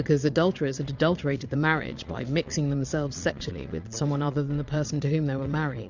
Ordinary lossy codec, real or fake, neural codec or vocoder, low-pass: Opus, 64 kbps; fake; vocoder, 22.05 kHz, 80 mel bands, Vocos; 7.2 kHz